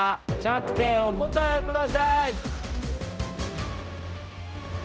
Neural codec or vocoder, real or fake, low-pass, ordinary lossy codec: codec, 16 kHz, 0.5 kbps, X-Codec, HuBERT features, trained on general audio; fake; none; none